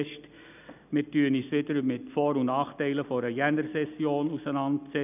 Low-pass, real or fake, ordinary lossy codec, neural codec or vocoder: 3.6 kHz; real; none; none